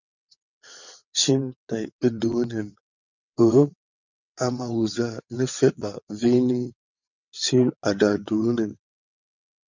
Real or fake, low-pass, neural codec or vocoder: fake; 7.2 kHz; vocoder, 22.05 kHz, 80 mel bands, WaveNeXt